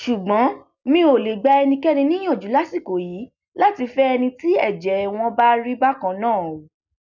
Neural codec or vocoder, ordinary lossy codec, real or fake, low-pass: none; none; real; 7.2 kHz